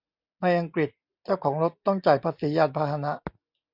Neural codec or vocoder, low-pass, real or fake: none; 5.4 kHz; real